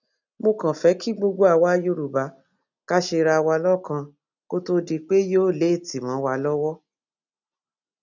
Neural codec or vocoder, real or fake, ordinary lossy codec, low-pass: none; real; none; 7.2 kHz